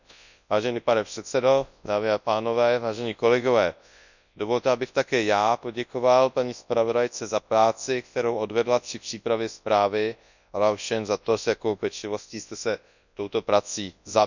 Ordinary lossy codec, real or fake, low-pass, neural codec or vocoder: none; fake; 7.2 kHz; codec, 24 kHz, 0.9 kbps, WavTokenizer, large speech release